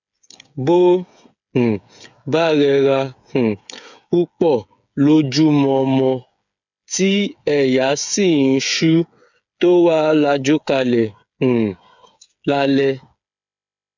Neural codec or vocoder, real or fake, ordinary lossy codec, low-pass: codec, 16 kHz, 8 kbps, FreqCodec, smaller model; fake; none; 7.2 kHz